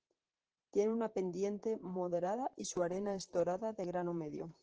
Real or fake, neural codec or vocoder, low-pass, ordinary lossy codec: fake; vocoder, 44.1 kHz, 128 mel bands, Pupu-Vocoder; 7.2 kHz; Opus, 16 kbps